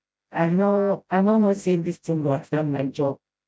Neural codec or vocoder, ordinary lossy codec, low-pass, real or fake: codec, 16 kHz, 0.5 kbps, FreqCodec, smaller model; none; none; fake